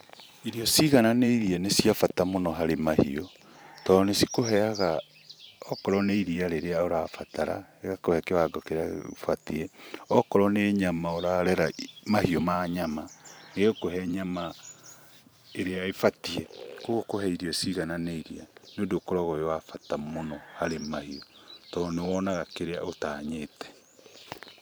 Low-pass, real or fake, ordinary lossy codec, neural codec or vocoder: none; fake; none; vocoder, 44.1 kHz, 128 mel bands every 512 samples, BigVGAN v2